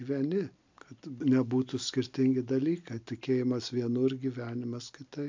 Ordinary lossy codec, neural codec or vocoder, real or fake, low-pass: MP3, 64 kbps; none; real; 7.2 kHz